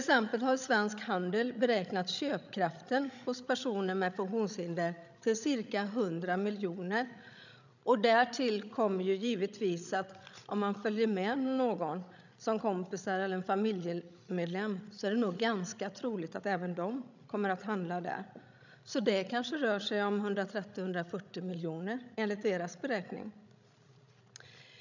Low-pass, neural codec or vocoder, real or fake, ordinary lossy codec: 7.2 kHz; codec, 16 kHz, 16 kbps, FreqCodec, larger model; fake; none